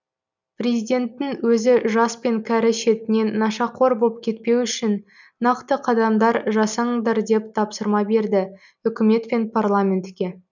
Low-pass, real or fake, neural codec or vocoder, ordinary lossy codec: 7.2 kHz; real; none; none